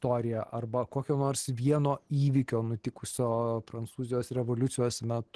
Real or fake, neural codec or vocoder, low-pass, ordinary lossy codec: real; none; 10.8 kHz; Opus, 16 kbps